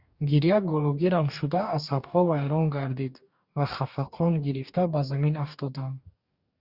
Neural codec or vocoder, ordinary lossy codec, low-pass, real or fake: codec, 44.1 kHz, 2.6 kbps, DAC; Opus, 64 kbps; 5.4 kHz; fake